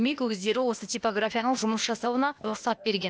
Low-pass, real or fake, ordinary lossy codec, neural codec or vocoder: none; fake; none; codec, 16 kHz, 0.8 kbps, ZipCodec